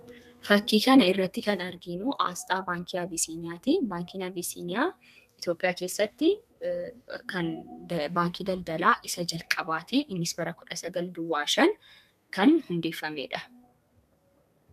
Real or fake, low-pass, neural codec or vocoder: fake; 14.4 kHz; codec, 32 kHz, 1.9 kbps, SNAC